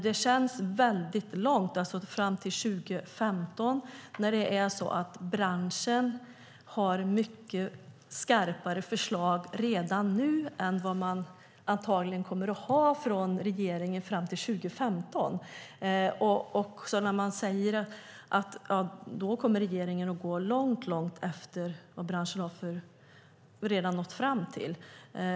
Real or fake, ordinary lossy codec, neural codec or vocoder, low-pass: real; none; none; none